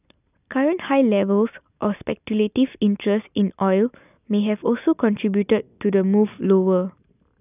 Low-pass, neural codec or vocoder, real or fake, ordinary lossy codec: 3.6 kHz; none; real; none